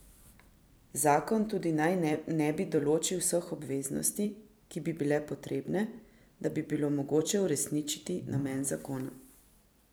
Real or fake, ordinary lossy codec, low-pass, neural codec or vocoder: fake; none; none; vocoder, 44.1 kHz, 128 mel bands every 256 samples, BigVGAN v2